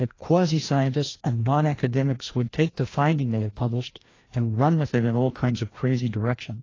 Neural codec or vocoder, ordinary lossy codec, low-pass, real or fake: codec, 16 kHz, 1 kbps, FreqCodec, larger model; AAC, 32 kbps; 7.2 kHz; fake